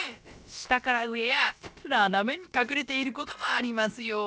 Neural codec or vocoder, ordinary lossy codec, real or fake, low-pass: codec, 16 kHz, about 1 kbps, DyCAST, with the encoder's durations; none; fake; none